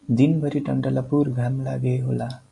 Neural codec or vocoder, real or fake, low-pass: none; real; 10.8 kHz